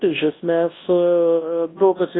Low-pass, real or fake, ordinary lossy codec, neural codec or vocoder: 7.2 kHz; fake; AAC, 16 kbps; codec, 24 kHz, 0.9 kbps, WavTokenizer, large speech release